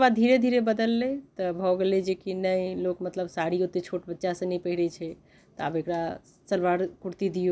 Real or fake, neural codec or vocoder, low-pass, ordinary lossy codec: real; none; none; none